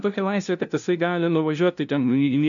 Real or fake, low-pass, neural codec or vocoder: fake; 7.2 kHz; codec, 16 kHz, 0.5 kbps, FunCodec, trained on LibriTTS, 25 frames a second